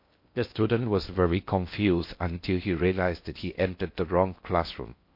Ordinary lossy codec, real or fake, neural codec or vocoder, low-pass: MP3, 32 kbps; fake; codec, 16 kHz in and 24 kHz out, 0.6 kbps, FocalCodec, streaming, 2048 codes; 5.4 kHz